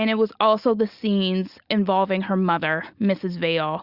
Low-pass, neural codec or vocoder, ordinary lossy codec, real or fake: 5.4 kHz; none; Opus, 64 kbps; real